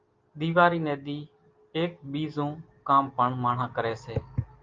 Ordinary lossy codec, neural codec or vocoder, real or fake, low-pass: Opus, 24 kbps; none; real; 7.2 kHz